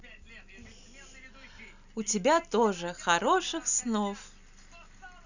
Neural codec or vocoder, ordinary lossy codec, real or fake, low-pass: none; none; real; 7.2 kHz